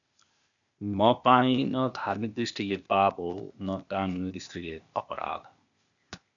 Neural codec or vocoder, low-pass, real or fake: codec, 16 kHz, 0.8 kbps, ZipCodec; 7.2 kHz; fake